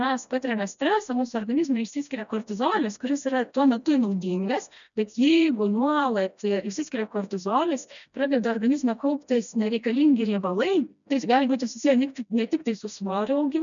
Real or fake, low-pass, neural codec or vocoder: fake; 7.2 kHz; codec, 16 kHz, 1 kbps, FreqCodec, smaller model